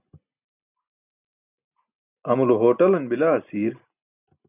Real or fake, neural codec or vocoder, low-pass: real; none; 3.6 kHz